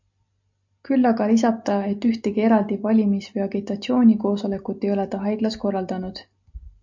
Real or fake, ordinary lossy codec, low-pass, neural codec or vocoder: real; MP3, 64 kbps; 7.2 kHz; none